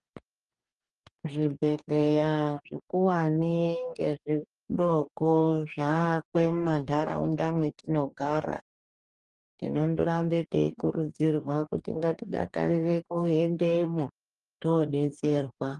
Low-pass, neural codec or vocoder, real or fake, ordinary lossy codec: 10.8 kHz; codec, 44.1 kHz, 2.6 kbps, DAC; fake; Opus, 32 kbps